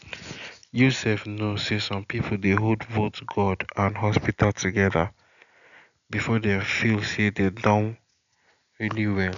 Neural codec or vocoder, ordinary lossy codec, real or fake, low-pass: none; none; real; 7.2 kHz